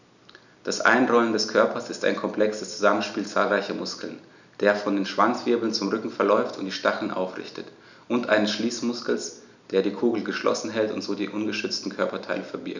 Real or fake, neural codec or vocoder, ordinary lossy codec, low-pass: real; none; none; 7.2 kHz